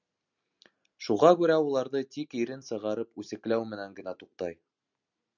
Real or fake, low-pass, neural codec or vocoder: real; 7.2 kHz; none